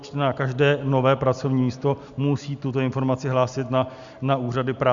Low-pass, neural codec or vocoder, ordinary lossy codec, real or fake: 7.2 kHz; none; Opus, 64 kbps; real